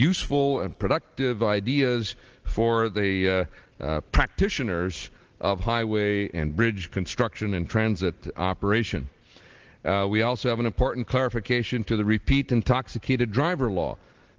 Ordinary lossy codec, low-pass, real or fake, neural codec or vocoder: Opus, 16 kbps; 7.2 kHz; real; none